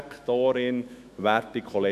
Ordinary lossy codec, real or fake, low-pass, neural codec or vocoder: none; fake; 14.4 kHz; autoencoder, 48 kHz, 128 numbers a frame, DAC-VAE, trained on Japanese speech